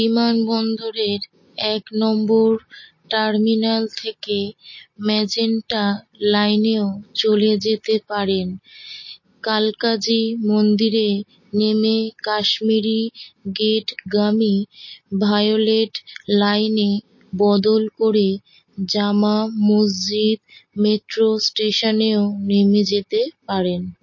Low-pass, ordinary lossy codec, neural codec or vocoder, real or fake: 7.2 kHz; MP3, 32 kbps; none; real